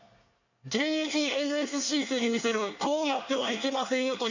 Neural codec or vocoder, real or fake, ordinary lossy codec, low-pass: codec, 24 kHz, 1 kbps, SNAC; fake; none; 7.2 kHz